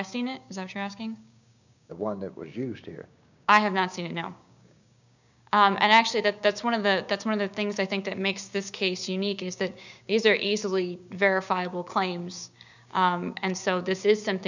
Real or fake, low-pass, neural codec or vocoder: fake; 7.2 kHz; codec, 16 kHz, 6 kbps, DAC